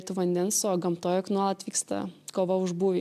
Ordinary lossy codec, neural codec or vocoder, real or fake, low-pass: AAC, 96 kbps; none; real; 14.4 kHz